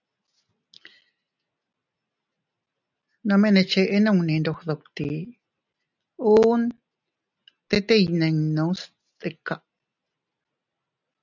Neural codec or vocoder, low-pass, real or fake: none; 7.2 kHz; real